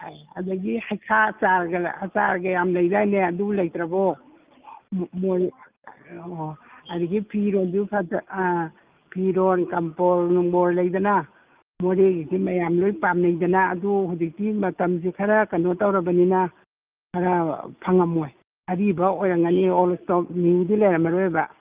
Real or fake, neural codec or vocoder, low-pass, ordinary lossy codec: real; none; 3.6 kHz; Opus, 24 kbps